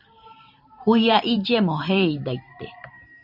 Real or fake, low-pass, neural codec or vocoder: fake; 5.4 kHz; vocoder, 44.1 kHz, 128 mel bands every 512 samples, BigVGAN v2